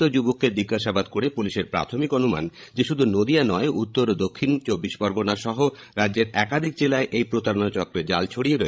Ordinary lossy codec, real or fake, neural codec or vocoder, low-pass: none; fake; codec, 16 kHz, 16 kbps, FreqCodec, larger model; none